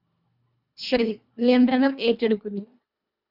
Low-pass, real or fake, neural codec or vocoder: 5.4 kHz; fake; codec, 24 kHz, 1.5 kbps, HILCodec